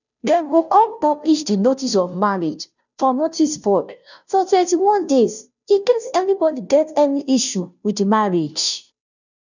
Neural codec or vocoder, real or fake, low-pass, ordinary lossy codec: codec, 16 kHz, 0.5 kbps, FunCodec, trained on Chinese and English, 25 frames a second; fake; 7.2 kHz; none